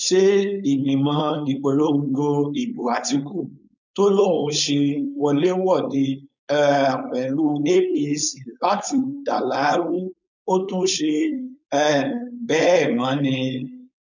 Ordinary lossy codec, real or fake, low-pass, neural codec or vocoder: none; fake; 7.2 kHz; codec, 16 kHz, 4.8 kbps, FACodec